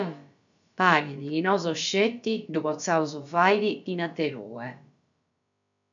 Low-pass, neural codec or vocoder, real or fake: 7.2 kHz; codec, 16 kHz, about 1 kbps, DyCAST, with the encoder's durations; fake